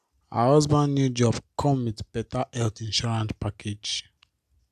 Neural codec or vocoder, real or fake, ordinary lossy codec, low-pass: none; real; AAC, 96 kbps; 14.4 kHz